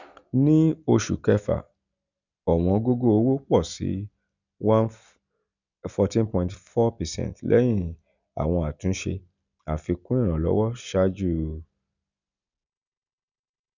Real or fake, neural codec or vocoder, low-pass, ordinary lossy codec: real; none; 7.2 kHz; none